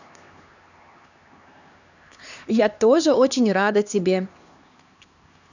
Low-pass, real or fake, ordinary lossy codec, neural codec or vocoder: 7.2 kHz; fake; none; codec, 16 kHz, 2 kbps, X-Codec, HuBERT features, trained on LibriSpeech